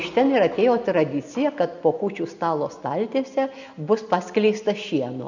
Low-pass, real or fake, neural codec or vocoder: 7.2 kHz; real; none